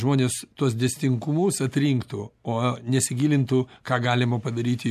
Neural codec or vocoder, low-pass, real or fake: none; 14.4 kHz; real